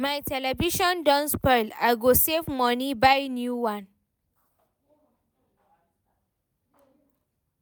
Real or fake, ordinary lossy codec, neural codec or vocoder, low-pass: real; none; none; none